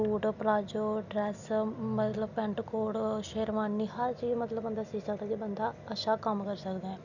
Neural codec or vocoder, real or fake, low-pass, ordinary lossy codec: none; real; 7.2 kHz; none